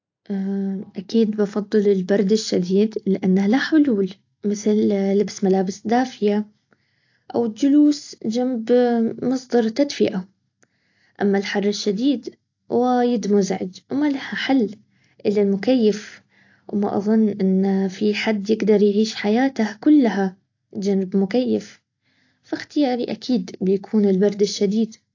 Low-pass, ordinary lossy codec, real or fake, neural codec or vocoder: 7.2 kHz; AAC, 48 kbps; real; none